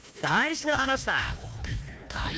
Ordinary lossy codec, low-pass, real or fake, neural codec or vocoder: none; none; fake; codec, 16 kHz, 1 kbps, FunCodec, trained on Chinese and English, 50 frames a second